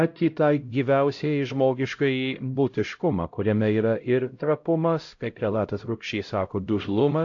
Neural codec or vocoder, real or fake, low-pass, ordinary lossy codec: codec, 16 kHz, 0.5 kbps, X-Codec, HuBERT features, trained on LibriSpeech; fake; 7.2 kHz; AAC, 48 kbps